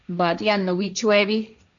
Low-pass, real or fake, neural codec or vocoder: 7.2 kHz; fake; codec, 16 kHz, 1.1 kbps, Voila-Tokenizer